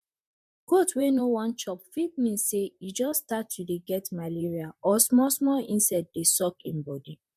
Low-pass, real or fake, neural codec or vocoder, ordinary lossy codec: 14.4 kHz; fake; vocoder, 44.1 kHz, 128 mel bands, Pupu-Vocoder; MP3, 96 kbps